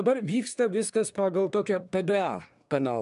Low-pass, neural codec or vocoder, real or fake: 10.8 kHz; codec, 24 kHz, 1 kbps, SNAC; fake